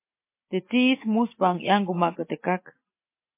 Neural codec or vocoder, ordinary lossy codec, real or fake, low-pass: none; MP3, 24 kbps; real; 3.6 kHz